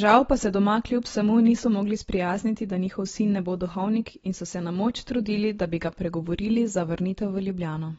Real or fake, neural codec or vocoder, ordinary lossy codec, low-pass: real; none; AAC, 24 kbps; 19.8 kHz